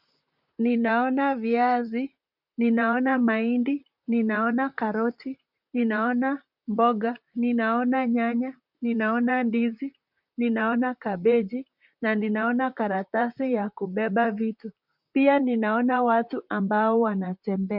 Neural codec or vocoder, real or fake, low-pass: vocoder, 44.1 kHz, 128 mel bands, Pupu-Vocoder; fake; 5.4 kHz